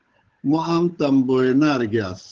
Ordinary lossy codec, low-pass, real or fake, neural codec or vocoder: Opus, 16 kbps; 7.2 kHz; fake; codec, 16 kHz, 8 kbps, FunCodec, trained on Chinese and English, 25 frames a second